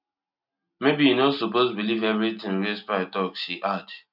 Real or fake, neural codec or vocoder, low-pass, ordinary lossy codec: real; none; 5.4 kHz; none